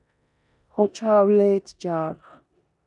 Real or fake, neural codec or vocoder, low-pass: fake; codec, 16 kHz in and 24 kHz out, 0.9 kbps, LongCat-Audio-Codec, four codebook decoder; 10.8 kHz